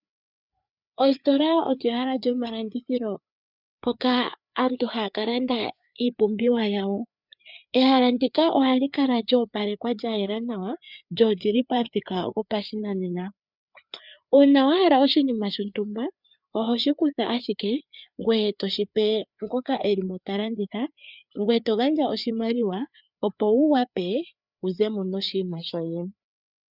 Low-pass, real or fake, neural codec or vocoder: 5.4 kHz; fake; codec, 16 kHz, 4 kbps, FreqCodec, larger model